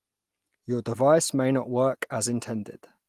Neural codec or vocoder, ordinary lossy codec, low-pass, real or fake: none; Opus, 24 kbps; 14.4 kHz; real